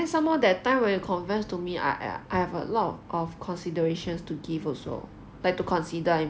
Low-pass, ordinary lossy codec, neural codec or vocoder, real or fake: none; none; none; real